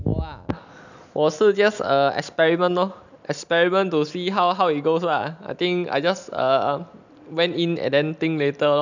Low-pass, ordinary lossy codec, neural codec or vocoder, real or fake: 7.2 kHz; none; none; real